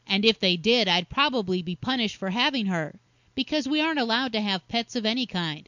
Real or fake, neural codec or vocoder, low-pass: real; none; 7.2 kHz